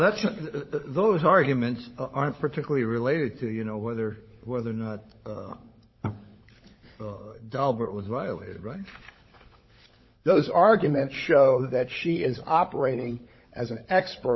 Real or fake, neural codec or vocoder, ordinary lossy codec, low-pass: fake; codec, 16 kHz, 16 kbps, FunCodec, trained on LibriTTS, 50 frames a second; MP3, 24 kbps; 7.2 kHz